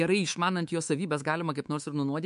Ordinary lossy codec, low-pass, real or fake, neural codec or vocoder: MP3, 64 kbps; 10.8 kHz; fake; codec, 24 kHz, 3.1 kbps, DualCodec